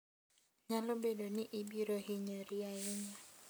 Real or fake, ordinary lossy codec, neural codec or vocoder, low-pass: real; none; none; none